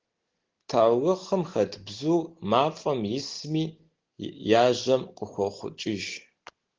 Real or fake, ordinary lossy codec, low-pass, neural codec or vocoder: real; Opus, 16 kbps; 7.2 kHz; none